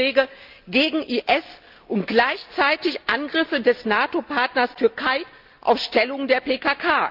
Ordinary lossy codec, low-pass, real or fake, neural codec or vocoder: Opus, 24 kbps; 5.4 kHz; real; none